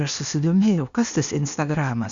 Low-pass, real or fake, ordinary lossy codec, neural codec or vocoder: 7.2 kHz; fake; Opus, 64 kbps; codec, 16 kHz, 0.8 kbps, ZipCodec